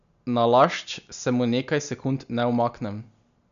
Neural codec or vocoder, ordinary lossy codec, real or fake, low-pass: none; none; real; 7.2 kHz